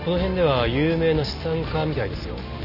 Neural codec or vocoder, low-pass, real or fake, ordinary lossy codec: none; 5.4 kHz; real; none